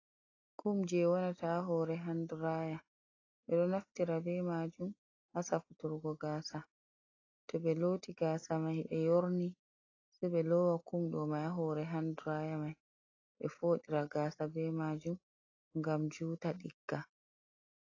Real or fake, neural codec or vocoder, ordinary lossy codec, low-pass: real; none; AAC, 32 kbps; 7.2 kHz